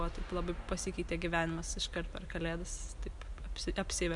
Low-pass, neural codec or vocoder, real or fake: 10.8 kHz; none; real